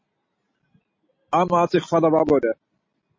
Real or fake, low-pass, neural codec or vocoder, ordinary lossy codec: real; 7.2 kHz; none; MP3, 32 kbps